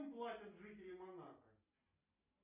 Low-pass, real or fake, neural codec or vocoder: 3.6 kHz; real; none